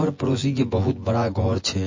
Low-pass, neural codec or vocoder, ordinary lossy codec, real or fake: 7.2 kHz; vocoder, 24 kHz, 100 mel bands, Vocos; MP3, 32 kbps; fake